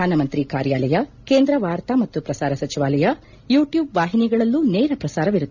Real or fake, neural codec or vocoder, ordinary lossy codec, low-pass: real; none; none; 7.2 kHz